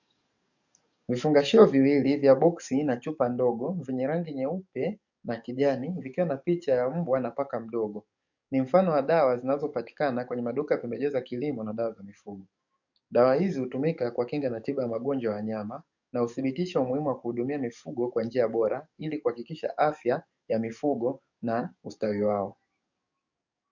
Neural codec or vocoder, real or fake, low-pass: codec, 44.1 kHz, 7.8 kbps, DAC; fake; 7.2 kHz